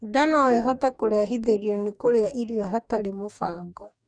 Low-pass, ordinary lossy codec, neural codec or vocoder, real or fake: 9.9 kHz; none; codec, 44.1 kHz, 2.6 kbps, DAC; fake